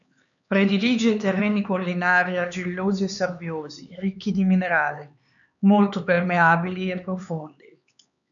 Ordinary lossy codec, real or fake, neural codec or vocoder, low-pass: MP3, 96 kbps; fake; codec, 16 kHz, 4 kbps, X-Codec, HuBERT features, trained on LibriSpeech; 7.2 kHz